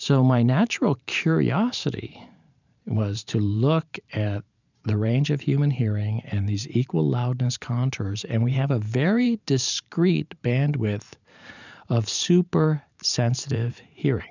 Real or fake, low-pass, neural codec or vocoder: fake; 7.2 kHz; vocoder, 44.1 kHz, 128 mel bands every 512 samples, BigVGAN v2